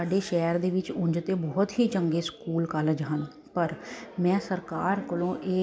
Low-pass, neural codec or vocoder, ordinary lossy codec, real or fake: none; none; none; real